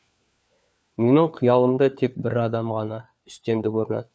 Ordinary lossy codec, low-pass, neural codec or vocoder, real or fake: none; none; codec, 16 kHz, 4 kbps, FunCodec, trained on LibriTTS, 50 frames a second; fake